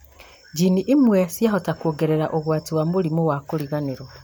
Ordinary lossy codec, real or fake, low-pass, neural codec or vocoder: none; real; none; none